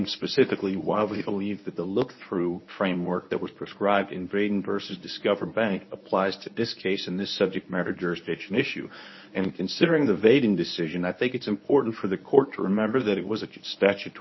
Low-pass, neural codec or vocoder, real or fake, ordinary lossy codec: 7.2 kHz; codec, 24 kHz, 0.9 kbps, WavTokenizer, medium speech release version 1; fake; MP3, 24 kbps